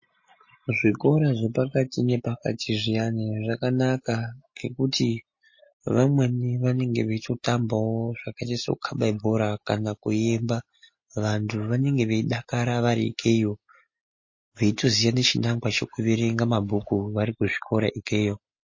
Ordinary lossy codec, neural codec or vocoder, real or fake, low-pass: MP3, 32 kbps; none; real; 7.2 kHz